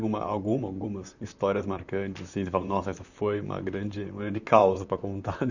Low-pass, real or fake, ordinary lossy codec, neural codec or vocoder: 7.2 kHz; fake; none; vocoder, 44.1 kHz, 128 mel bands, Pupu-Vocoder